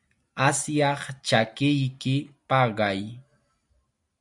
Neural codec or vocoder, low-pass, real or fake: none; 10.8 kHz; real